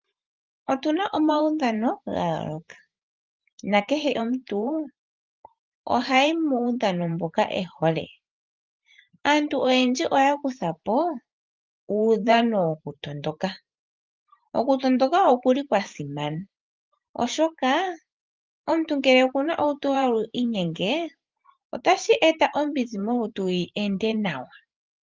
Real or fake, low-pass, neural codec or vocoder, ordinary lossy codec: fake; 7.2 kHz; vocoder, 44.1 kHz, 128 mel bands every 512 samples, BigVGAN v2; Opus, 24 kbps